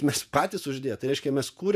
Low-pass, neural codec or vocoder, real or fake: 14.4 kHz; vocoder, 48 kHz, 128 mel bands, Vocos; fake